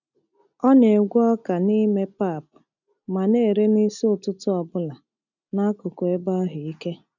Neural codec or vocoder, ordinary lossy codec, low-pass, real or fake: none; none; 7.2 kHz; real